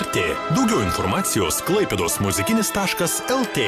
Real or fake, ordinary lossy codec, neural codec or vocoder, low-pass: fake; AAC, 96 kbps; vocoder, 44.1 kHz, 128 mel bands every 512 samples, BigVGAN v2; 14.4 kHz